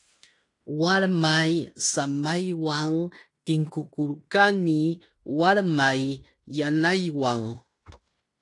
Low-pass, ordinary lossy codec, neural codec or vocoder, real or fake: 10.8 kHz; AAC, 48 kbps; codec, 16 kHz in and 24 kHz out, 0.9 kbps, LongCat-Audio-Codec, fine tuned four codebook decoder; fake